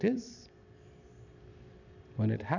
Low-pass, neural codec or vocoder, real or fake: 7.2 kHz; vocoder, 44.1 kHz, 128 mel bands every 256 samples, BigVGAN v2; fake